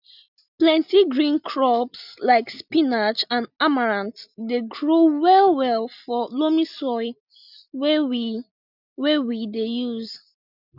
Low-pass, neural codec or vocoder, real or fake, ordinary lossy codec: 5.4 kHz; none; real; none